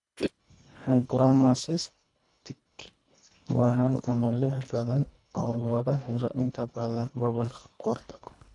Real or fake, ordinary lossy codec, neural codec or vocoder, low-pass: fake; none; codec, 24 kHz, 1.5 kbps, HILCodec; 10.8 kHz